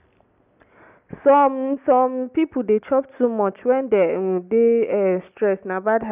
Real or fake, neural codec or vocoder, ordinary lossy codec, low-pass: real; none; none; 3.6 kHz